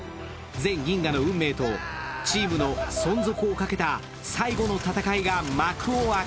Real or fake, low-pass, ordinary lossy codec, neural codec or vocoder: real; none; none; none